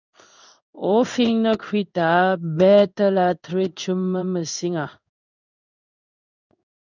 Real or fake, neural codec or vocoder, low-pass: fake; codec, 16 kHz in and 24 kHz out, 1 kbps, XY-Tokenizer; 7.2 kHz